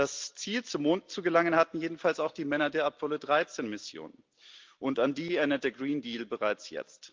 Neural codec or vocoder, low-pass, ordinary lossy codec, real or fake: none; 7.2 kHz; Opus, 32 kbps; real